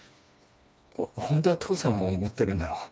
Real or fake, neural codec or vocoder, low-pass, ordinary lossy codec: fake; codec, 16 kHz, 2 kbps, FreqCodec, smaller model; none; none